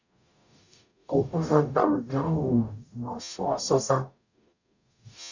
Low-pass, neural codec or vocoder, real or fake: 7.2 kHz; codec, 44.1 kHz, 0.9 kbps, DAC; fake